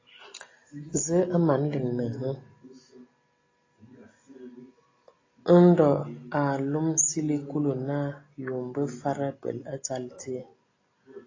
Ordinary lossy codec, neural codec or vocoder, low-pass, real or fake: AAC, 32 kbps; none; 7.2 kHz; real